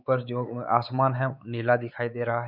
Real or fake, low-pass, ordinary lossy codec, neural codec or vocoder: fake; 5.4 kHz; none; codec, 16 kHz, 4 kbps, X-Codec, WavLM features, trained on Multilingual LibriSpeech